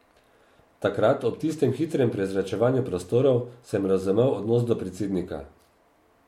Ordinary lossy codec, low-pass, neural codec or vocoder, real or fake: MP3, 64 kbps; 19.8 kHz; none; real